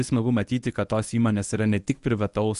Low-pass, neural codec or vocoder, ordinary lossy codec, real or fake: 10.8 kHz; codec, 24 kHz, 0.9 kbps, WavTokenizer, medium speech release version 1; Opus, 64 kbps; fake